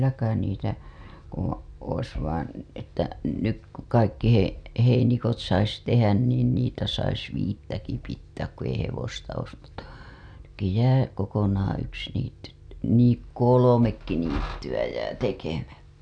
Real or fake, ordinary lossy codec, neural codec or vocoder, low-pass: real; none; none; 9.9 kHz